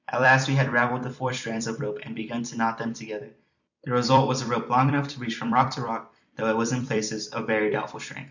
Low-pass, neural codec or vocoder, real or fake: 7.2 kHz; vocoder, 44.1 kHz, 128 mel bands every 256 samples, BigVGAN v2; fake